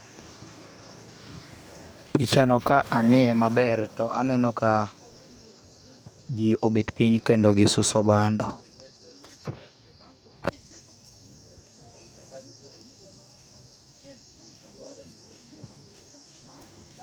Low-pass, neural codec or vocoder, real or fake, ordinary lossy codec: none; codec, 44.1 kHz, 2.6 kbps, DAC; fake; none